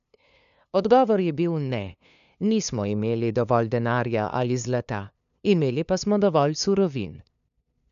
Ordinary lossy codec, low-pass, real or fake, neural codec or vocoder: none; 7.2 kHz; fake; codec, 16 kHz, 2 kbps, FunCodec, trained on LibriTTS, 25 frames a second